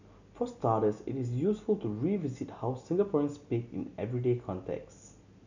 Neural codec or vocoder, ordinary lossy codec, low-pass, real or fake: none; none; 7.2 kHz; real